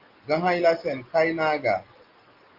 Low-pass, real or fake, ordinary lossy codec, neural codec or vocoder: 5.4 kHz; real; Opus, 32 kbps; none